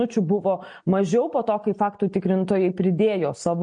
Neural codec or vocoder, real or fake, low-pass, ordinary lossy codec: none; real; 9.9 kHz; MP3, 48 kbps